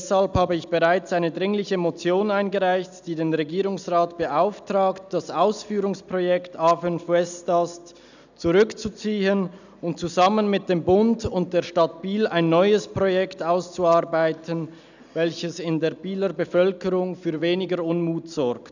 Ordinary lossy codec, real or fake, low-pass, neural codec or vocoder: none; real; 7.2 kHz; none